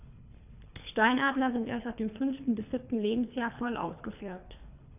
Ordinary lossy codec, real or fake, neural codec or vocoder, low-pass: none; fake; codec, 24 kHz, 3 kbps, HILCodec; 3.6 kHz